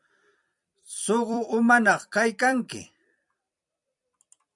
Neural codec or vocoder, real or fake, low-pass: vocoder, 44.1 kHz, 128 mel bands every 256 samples, BigVGAN v2; fake; 10.8 kHz